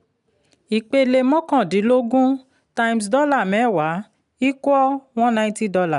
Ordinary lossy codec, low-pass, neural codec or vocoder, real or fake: none; 10.8 kHz; none; real